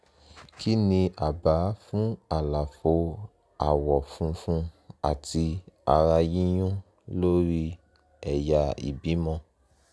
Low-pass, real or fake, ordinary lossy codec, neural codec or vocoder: none; real; none; none